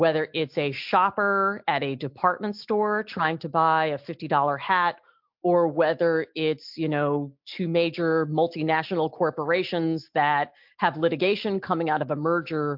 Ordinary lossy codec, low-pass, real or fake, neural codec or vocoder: MP3, 48 kbps; 5.4 kHz; real; none